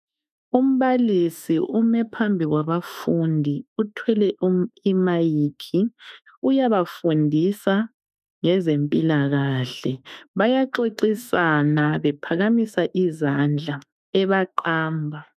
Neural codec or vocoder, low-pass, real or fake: autoencoder, 48 kHz, 32 numbers a frame, DAC-VAE, trained on Japanese speech; 14.4 kHz; fake